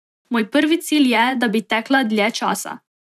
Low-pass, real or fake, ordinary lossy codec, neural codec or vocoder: 14.4 kHz; fake; none; vocoder, 44.1 kHz, 128 mel bands every 512 samples, BigVGAN v2